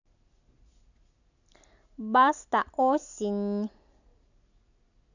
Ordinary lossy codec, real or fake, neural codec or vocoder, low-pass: none; real; none; 7.2 kHz